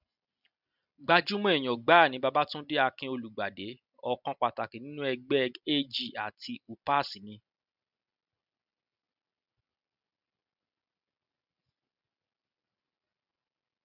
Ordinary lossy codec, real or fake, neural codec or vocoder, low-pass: none; real; none; 5.4 kHz